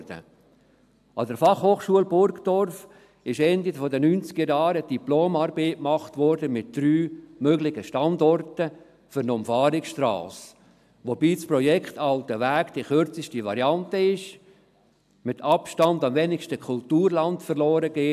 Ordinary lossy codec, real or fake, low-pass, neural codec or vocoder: AAC, 96 kbps; real; 14.4 kHz; none